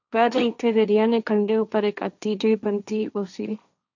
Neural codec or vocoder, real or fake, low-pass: codec, 16 kHz, 1.1 kbps, Voila-Tokenizer; fake; 7.2 kHz